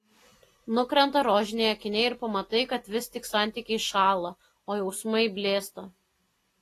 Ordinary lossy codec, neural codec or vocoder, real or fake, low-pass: AAC, 48 kbps; none; real; 14.4 kHz